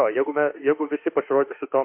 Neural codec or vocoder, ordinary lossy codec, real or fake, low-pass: autoencoder, 48 kHz, 32 numbers a frame, DAC-VAE, trained on Japanese speech; MP3, 32 kbps; fake; 3.6 kHz